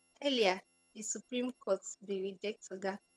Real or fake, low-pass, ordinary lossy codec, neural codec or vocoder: fake; none; none; vocoder, 22.05 kHz, 80 mel bands, HiFi-GAN